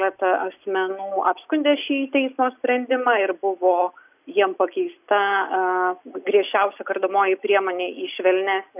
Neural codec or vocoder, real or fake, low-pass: none; real; 3.6 kHz